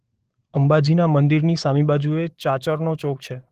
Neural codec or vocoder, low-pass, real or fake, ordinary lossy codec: codec, 44.1 kHz, 7.8 kbps, DAC; 14.4 kHz; fake; Opus, 16 kbps